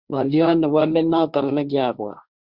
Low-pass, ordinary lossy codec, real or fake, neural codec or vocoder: 5.4 kHz; Opus, 64 kbps; fake; codec, 16 kHz, 1.1 kbps, Voila-Tokenizer